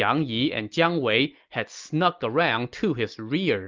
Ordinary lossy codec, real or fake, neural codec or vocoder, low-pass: Opus, 24 kbps; real; none; 7.2 kHz